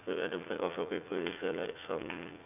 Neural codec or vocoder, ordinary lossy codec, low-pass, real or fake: vocoder, 44.1 kHz, 80 mel bands, Vocos; none; 3.6 kHz; fake